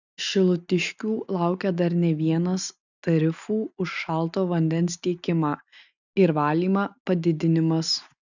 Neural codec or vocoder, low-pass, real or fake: none; 7.2 kHz; real